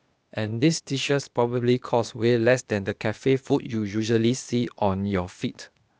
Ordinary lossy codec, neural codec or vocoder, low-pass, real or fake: none; codec, 16 kHz, 0.8 kbps, ZipCodec; none; fake